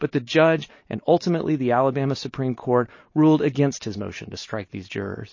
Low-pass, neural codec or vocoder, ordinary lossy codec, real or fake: 7.2 kHz; none; MP3, 32 kbps; real